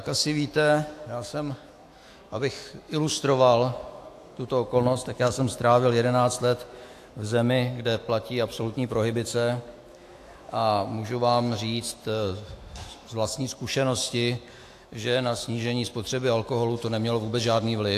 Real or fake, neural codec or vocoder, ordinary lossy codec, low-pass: fake; autoencoder, 48 kHz, 128 numbers a frame, DAC-VAE, trained on Japanese speech; AAC, 64 kbps; 14.4 kHz